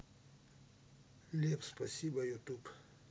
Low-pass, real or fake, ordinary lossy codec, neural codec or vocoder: none; real; none; none